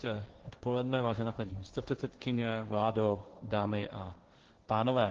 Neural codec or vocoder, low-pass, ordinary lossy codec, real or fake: codec, 16 kHz, 1.1 kbps, Voila-Tokenizer; 7.2 kHz; Opus, 16 kbps; fake